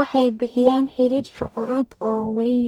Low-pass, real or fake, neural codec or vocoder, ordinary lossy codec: 19.8 kHz; fake; codec, 44.1 kHz, 0.9 kbps, DAC; none